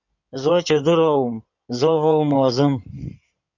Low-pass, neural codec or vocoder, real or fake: 7.2 kHz; codec, 16 kHz in and 24 kHz out, 2.2 kbps, FireRedTTS-2 codec; fake